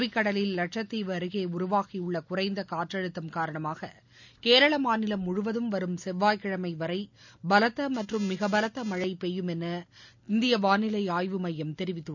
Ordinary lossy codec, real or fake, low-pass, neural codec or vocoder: none; real; 7.2 kHz; none